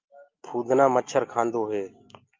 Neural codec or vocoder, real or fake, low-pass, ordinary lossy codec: none; real; 7.2 kHz; Opus, 24 kbps